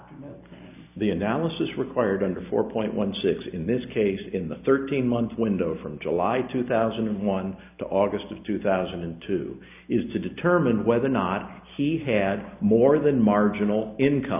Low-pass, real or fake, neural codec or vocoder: 3.6 kHz; real; none